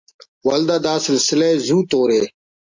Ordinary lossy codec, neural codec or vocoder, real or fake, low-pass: MP3, 64 kbps; none; real; 7.2 kHz